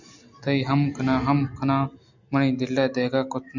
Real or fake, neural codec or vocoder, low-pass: real; none; 7.2 kHz